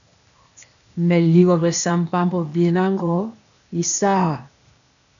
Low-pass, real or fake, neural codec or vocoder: 7.2 kHz; fake; codec, 16 kHz, 0.8 kbps, ZipCodec